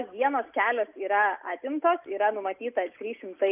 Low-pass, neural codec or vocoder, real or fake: 3.6 kHz; none; real